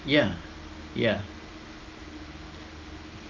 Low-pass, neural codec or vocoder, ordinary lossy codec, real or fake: 7.2 kHz; none; Opus, 32 kbps; real